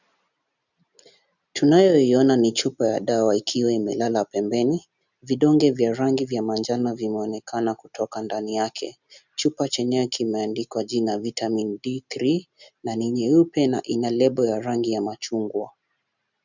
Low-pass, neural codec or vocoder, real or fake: 7.2 kHz; none; real